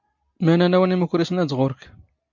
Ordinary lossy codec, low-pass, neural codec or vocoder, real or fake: MP3, 48 kbps; 7.2 kHz; none; real